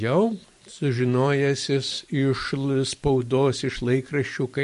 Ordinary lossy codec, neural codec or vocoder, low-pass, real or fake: MP3, 48 kbps; vocoder, 44.1 kHz, 128 mel bands every 512 samples, BigVGAN v2; 14.4 kHz; fake